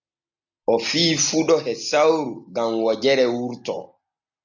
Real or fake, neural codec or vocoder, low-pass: real; none; 7.2 kHz